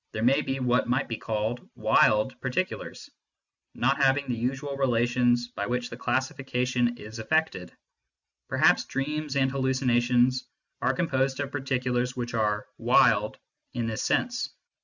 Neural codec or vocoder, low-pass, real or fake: none; 7.2 kHz; real